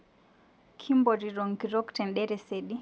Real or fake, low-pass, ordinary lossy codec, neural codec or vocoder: real; none; none; none